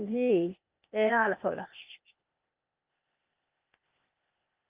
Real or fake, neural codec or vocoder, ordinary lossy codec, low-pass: fake; codec, 16 kHz, 0.8 kbps, ZipCodec; Opus, 24 kbps; 3.6 kHz